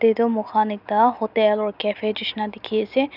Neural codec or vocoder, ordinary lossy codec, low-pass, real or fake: none; none; 5.4 kHz; real